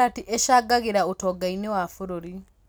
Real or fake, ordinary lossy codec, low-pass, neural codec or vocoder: real; none; none; none